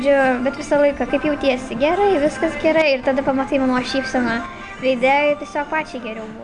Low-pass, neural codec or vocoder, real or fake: 9.9 kHz; none; real